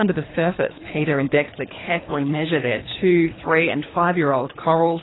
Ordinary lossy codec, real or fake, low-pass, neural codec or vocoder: AAC, 16 kbps; fake; 7.2 kHz; codec, 24 kHz, 3 kbps, HILCodec